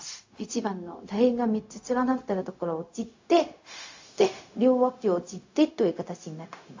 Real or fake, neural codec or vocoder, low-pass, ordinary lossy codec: fake; codec, 16 kHz, 0.4 kbps, LongCat-Audio-Codec; 7.2 kHz; AAC, 48 kbps